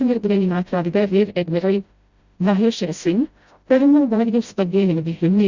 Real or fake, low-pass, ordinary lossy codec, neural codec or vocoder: fake; 7.2 kHz; Opus, 64 kbps; codec, 16 kHz, 0.5 kbps, FreqCodec, smaller model